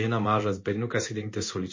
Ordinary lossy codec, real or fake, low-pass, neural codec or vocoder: MP3, 32 kbps; fake; 7.2 kHz; codec, 16 kHz in and 24 kHz out, 1 kbps, XY-Tokenizer